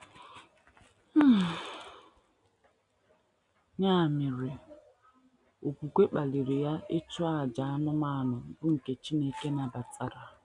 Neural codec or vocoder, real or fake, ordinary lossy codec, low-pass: none; real; none; 10.8 kHz